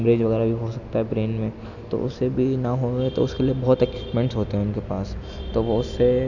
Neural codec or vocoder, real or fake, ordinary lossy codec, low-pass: none; real; none; 7.2 kHz